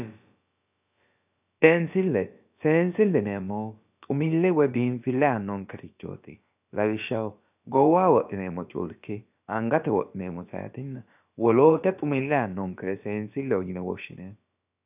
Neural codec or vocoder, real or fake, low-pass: codec, 16 kHz, about 1 kbps, DyCAST, with the encoder's durations; fake; 3.6 kHz